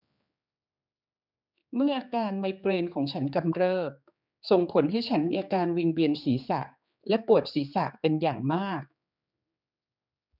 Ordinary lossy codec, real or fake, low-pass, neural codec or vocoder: none; fake; 5.4 kHz; codec, 16 kHz, 4 kbps, X-Codec, HuBERT features, trained on general audio